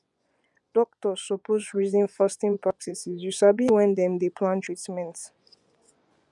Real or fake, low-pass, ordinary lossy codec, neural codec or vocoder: fake; 10.8 kHz; none; vocoder, 24 kHz, 100 mel bands, Vocos